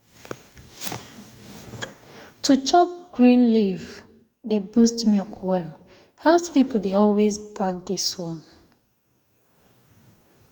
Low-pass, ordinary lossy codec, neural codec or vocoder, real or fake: 19.8 kHz; none; codec, 44.1 kHz, 2.6 kbps, DAC; fake